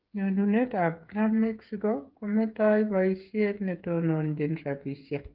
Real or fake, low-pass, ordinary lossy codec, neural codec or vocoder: fake; 5.4 kHz; Opus, 32 kbps; codec, 16 kHz, 8 kbps, FreqCodec, smaller model